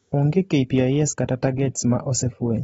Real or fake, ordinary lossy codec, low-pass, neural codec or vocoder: real; AAC, 24 kbps; 19.8 kHz; none